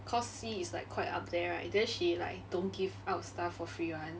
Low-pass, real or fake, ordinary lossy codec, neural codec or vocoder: none; real; none; none